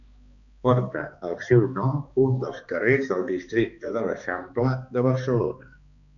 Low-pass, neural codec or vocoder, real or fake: 7.2 kHz; codec, 16 kHz, 2 kbps, X-Codec, HuBERT features, trained on balanced general audio; fake